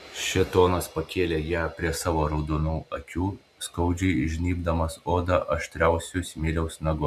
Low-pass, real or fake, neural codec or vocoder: 14.4 kHz; real; none